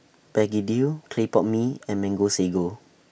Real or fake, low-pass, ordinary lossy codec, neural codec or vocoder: real; none; none; none